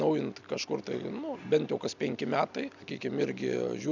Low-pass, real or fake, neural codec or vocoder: 7.2 kHz; real; none